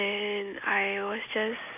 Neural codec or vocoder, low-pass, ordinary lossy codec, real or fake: none; 3.6 kHz; MP3, 32 kbps; real